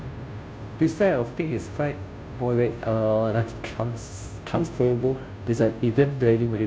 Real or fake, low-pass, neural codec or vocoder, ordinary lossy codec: fake; none; codec, 16 kHz, 0.5 kbps, FunCodec, trained on Chinese and English, 25 frames a second; none